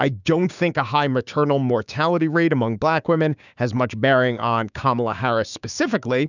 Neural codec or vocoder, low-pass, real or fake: codec, 16 kHz, 6 kbps, DAC; 7.2 kHz; fake